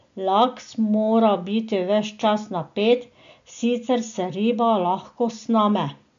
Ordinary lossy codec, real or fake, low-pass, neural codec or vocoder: AAC, 64 kbps; real; 7.2 kHz; none